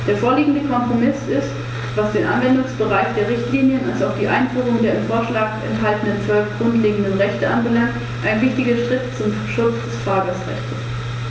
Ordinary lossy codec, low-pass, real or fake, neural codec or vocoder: none; none; real; none